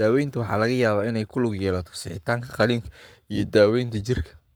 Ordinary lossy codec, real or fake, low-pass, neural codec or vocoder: none; fake; none; codec, 44.1 kHz, 7.8 kbps, Pupu-Codec